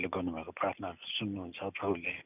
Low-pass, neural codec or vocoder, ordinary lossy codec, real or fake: 3.6 kHz; none; none; real